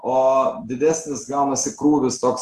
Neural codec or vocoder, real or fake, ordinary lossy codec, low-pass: none; real; Opus, 24 kbps; 14.4 kHz